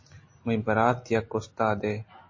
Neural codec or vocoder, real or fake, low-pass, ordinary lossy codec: none; real; 7.2 kHz; MP3, 32 kbps